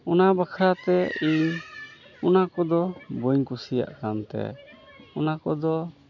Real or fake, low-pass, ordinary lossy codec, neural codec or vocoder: real; 7.2 kHz; none; none